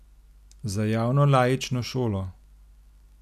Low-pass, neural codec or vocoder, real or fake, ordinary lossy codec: 14.4 kHz; none; real; none